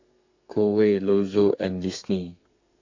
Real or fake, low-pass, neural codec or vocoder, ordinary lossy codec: fake; 7.2 kHz; codec, 32 kHz, 1.9 kbps, SNAC; AAC, 48 kbps